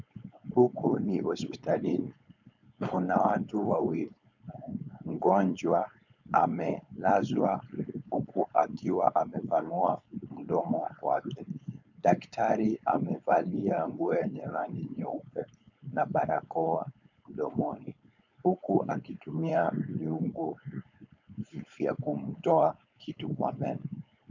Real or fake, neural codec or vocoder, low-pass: fake; codec, 16 kHz, 4.8 kbps, FACodec; 7.2 kHz